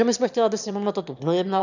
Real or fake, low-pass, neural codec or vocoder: fake; 7.2 kHz; autoencoder, 22.05 kHz, a latent of 192 numbers a frame, VITS, trained on one speaker